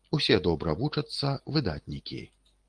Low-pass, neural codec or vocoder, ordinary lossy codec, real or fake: 9.9 kHz; none; Opus, 24 kbps; real